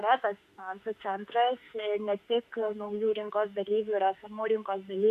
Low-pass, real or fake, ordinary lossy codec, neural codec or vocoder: 14.4 kHz; fake; MP3, 96 kbps; autoencoder, 48 kHz, 32 numbers a frame, DAC-VAE, trained on Japanese speech